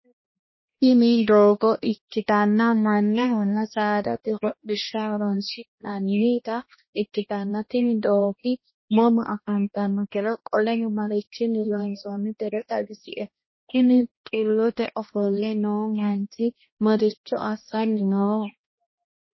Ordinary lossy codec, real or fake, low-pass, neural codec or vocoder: MP3, 24 kbps; fake; 7.2 kHz; codec, 16 kHz, 1 kbps, X-Codec, HuBERT features, trained on balanced general audio